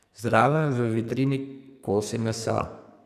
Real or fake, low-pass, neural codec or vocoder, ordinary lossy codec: fake; 14.4 kHz; codec, 44.1 kHz, 2.6 kbps, SNAC; none